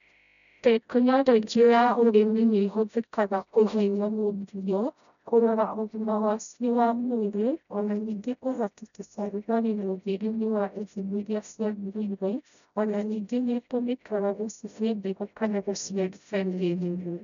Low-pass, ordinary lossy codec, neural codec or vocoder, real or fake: 7.2 kHz; none; codec, 16 kHz, 0.5 kbps, FreqCodec, smaller model; fake